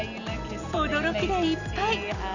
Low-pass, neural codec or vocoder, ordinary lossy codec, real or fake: 7.2 kHz; none; none; real